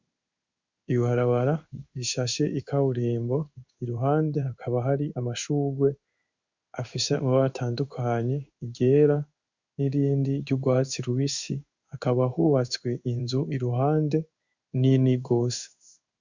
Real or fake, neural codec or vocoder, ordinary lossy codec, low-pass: fake; codec, 16 kHz in and 24 kHz out, 1 kbps, XY-Tokenizer; Opus, 64 kbps; 7.2 kHz